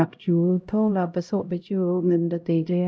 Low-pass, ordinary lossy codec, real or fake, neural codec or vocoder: none; none; fake; codec, 16 kHz, 0.5 kbps, X-Codec, HuBERT features, trained on LibriSpeech